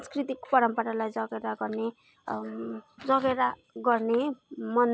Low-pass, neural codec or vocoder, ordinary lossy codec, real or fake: none; none; none; real